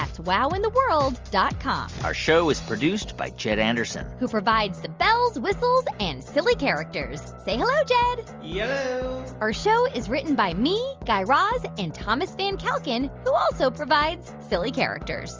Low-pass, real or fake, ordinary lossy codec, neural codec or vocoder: 7.2 kHz; real; Opus, 32 kbps; none